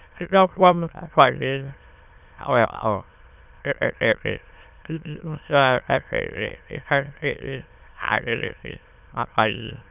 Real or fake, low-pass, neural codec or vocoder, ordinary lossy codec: fake; 3.6 kHz; autoencoder, 22.05 kHz, a latent of 192 numbers a frame, VITS, trained on many speakers; none